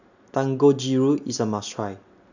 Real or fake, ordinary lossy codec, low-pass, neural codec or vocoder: real; none; 7.2 kHz; none